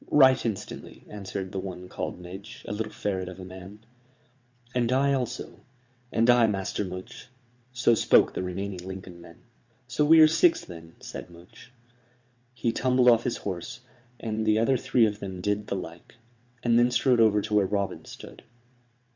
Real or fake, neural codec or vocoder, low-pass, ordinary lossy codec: fake; vocoder, 22.05 kHz, 80 mel bands, WaveNeXt; 7.2 kHz; MP3, 48 kbps